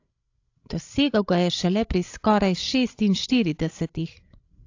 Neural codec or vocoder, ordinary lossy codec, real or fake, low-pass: codec, 16 kHz, 16 kbps, FreqCodec, larger model; AAC, 48 kbps; fake; 7.2 kHz